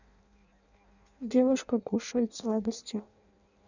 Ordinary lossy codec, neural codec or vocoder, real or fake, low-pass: none; codec, 16 kHz in and 24 kHz out, 0.6 kbps, FireRedTTS-2 codec; fake; 7.2 kHz